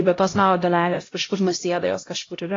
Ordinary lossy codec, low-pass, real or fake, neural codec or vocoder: AAC, 32 kbps; 7.2 kHz; fake; codec, 16 kHz, 0.5 kbps, X-Codec, HuBERT features, trained on LibriSpeech